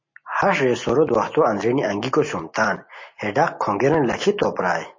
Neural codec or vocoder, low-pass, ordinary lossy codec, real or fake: none; 7.2 kHz; MP3, 32 kbps; real